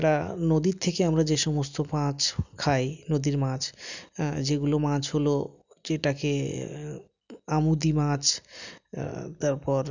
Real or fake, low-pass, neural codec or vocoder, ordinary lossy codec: real; 7.2 kHz; none; none